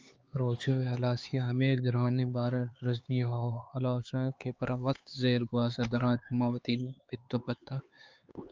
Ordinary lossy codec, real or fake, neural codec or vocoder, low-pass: Opus, 24 kbps; fake; codec, 16 kHz, 4 kbps, X-Codec, HuBERT features, trained on LibriSpeech; 7.2 kHz